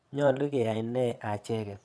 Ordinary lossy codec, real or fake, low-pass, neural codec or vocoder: none; fake; none; vocoder, 22.05 kHz, 80 mel bands, WaveNeXt